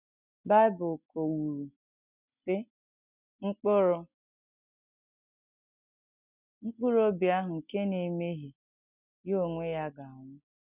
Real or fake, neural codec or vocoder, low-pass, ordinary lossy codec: real; none; 3.6 kHz; none